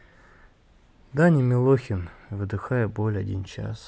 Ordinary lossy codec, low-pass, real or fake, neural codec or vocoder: none; none; real; none